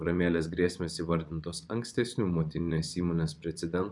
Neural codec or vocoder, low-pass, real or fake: vocoder, 24 kHz, 100 mel bands, Vocos; 10.8 kHz; fake